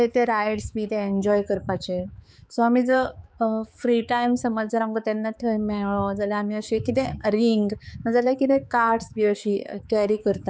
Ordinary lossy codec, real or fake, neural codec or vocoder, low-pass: none; fake; codec, 16 kHz, 4 kbps, X-Codec, HuBERT features, trained on balanced general audio; none